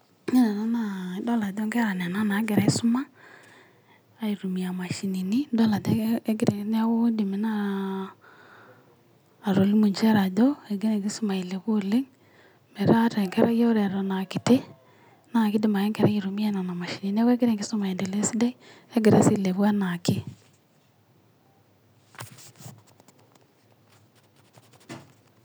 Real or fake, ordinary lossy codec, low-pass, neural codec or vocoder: real; none; none; none